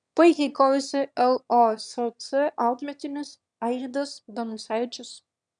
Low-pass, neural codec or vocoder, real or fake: 9.9 kHz; autoencoder, 22.05 kHz, a latent of 192 numbers a frame, VITS, trained on one speaker; fake